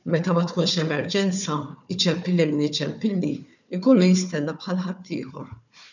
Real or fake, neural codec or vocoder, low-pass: fake; codec, 16 kHz, 4 kbps, FunCodec, trained on Chinese and English, 50 frames a second; 7.2 kHz